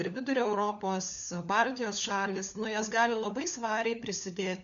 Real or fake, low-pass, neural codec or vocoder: fake; 7.2 kHz; codec, 16 kHz, 4 kbps, FunCodec, trained on LibriTTS, 50 frames a second